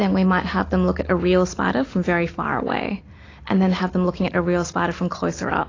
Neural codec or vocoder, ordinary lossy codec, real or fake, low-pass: none; AAC, 32 kbps; real; 7.2 kHz